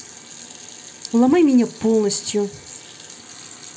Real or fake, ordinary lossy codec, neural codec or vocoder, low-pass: real; none; none; none